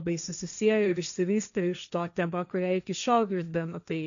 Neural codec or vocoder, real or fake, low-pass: codec, 16 kHz, 1.1 kbps, Voila-Tokenizer; fake; 7.2 kHz